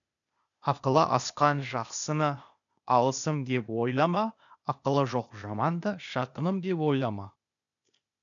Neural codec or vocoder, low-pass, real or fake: codec, 16 kHz, 0.8 kbps, ZipCodec; 7.2 kHz; fake